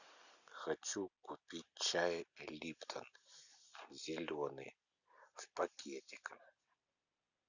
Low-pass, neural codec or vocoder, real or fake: 7.2 kHz; none; real